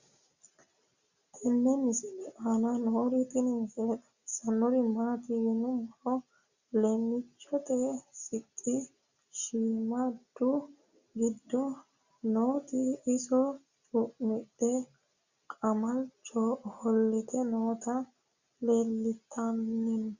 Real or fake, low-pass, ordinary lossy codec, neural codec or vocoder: real; 7.2 kHz; Opus, 64 kbps; none